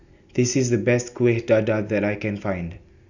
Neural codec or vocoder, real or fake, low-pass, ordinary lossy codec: none; real; 7.2 kHz; none